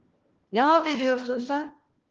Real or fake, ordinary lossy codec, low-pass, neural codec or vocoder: fake; Opus, 16 kbps; 7.2 kHz; codec, 16 kHz, 1 kbps, FunCodec, trained on LibriTTS, 50 frames a second